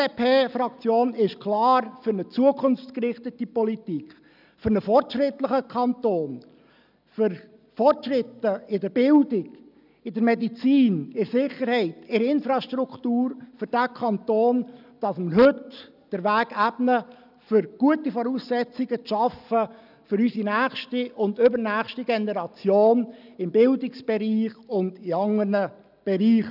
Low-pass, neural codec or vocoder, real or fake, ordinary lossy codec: 5.4 kHz; none; real; none